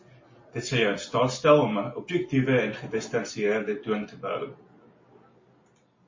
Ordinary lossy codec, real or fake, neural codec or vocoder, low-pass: MP3, 32 kbps; real; none; 7.2 kHz